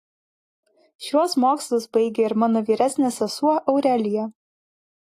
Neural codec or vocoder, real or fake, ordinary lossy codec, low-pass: none; real; AAC, 48 kbps; 14.4 kHz